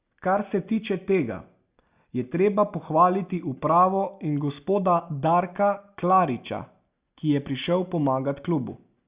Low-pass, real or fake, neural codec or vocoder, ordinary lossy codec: 3.6 kHz; real; none; Opus, 64 kbps